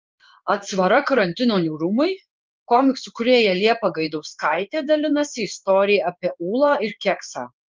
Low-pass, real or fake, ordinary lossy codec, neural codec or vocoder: 7.2 kHz; fake; Opus, 24 kbps; codec, 16 kHz in and 24 kHz out, 1 kbps, XY-Tokenizer